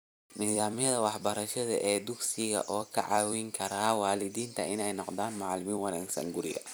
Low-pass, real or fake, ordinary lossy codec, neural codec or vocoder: none; fake; none; vocoder, 44.1 kHz, 128 mel bands every 256 samples, BigVGAN v2